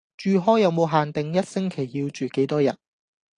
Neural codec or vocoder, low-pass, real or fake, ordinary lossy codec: none; 9.9 kHz; real; AAC, 48 kbps